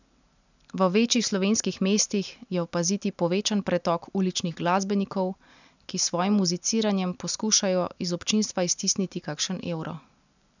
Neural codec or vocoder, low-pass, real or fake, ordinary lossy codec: none; 7.2 kHz; real; none